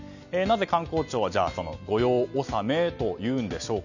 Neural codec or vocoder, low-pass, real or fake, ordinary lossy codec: none; 7.2 kHz; real; none